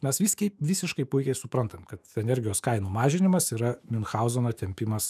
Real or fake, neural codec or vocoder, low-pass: fake; autoencoder, 48 kHz, 128 numbers a frame, DAC-VAE, trained on Japanese speech; 14.4 kHz